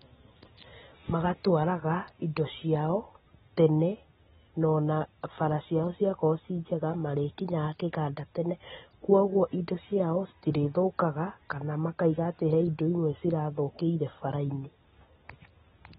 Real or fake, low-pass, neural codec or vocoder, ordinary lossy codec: real; 19.8 kHz; none; AAC, 16 kbps